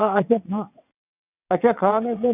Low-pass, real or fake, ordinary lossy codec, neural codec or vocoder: 3.6 kHz; fake; none; vocoder, 22.05 kHz, 80 mel bands, WaveNeXt